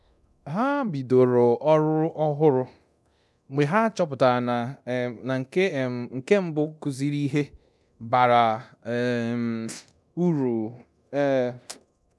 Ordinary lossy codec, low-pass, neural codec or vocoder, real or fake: none; none; codec, 24 kHz, 0.9 kbps, DualCodec; fake